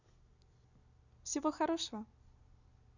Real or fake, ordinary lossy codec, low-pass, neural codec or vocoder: real; none; 7.2 kHz; none